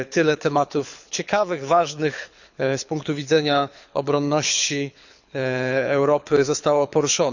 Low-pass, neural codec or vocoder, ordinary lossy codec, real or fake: 7.2 kHz; codec, 24 kHz, 6 kbps, HILCodec; none; fake